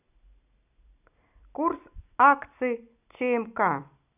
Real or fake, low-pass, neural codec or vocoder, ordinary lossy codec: real; 3.6 kHz; none; none